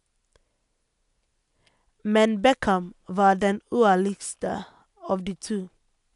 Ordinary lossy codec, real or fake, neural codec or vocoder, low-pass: none; real; none; 10.8 kHz